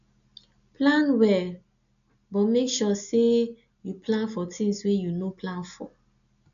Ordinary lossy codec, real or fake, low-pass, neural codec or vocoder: none; real; 7.2 kHz; none